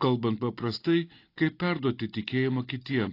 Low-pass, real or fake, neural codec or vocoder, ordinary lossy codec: 5.4 kHz; real; none; AAC, 32 kbps